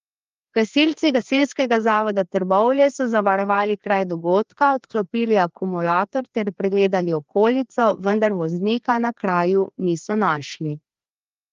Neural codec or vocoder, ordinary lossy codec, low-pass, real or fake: codec, 16 kHz, 2 kbps, FreqCodec, larger model; Opus, 32 kbps; 7.2 kHz; fake